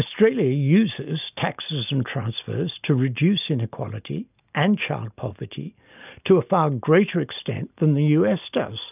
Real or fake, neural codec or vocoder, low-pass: real; none; 3.6 kHz